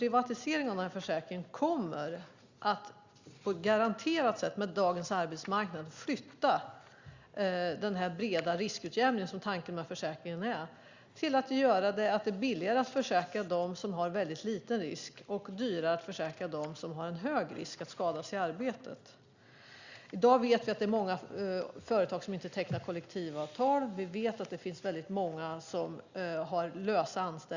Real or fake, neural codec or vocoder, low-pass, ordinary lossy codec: real; none; 7.2 kHz; Opus, 64 kbps